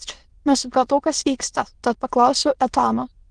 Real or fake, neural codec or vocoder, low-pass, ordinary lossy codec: fake; autoencoder, 22.05 kHz, a latent of 192 numbers a frame, VITS, trained on many speakers; 9.9 kHz; Opus, 16 kbps